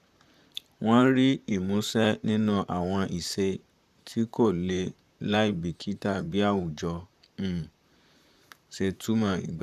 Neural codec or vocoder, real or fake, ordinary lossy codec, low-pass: vocoder, 44.1 kHz, 128 mel bands, Pupu-Vocoder; fake; none; 14.4 kHz